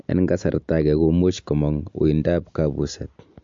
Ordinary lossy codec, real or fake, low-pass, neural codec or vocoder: MP3, 48 kbps; real; 7.2 kHz; none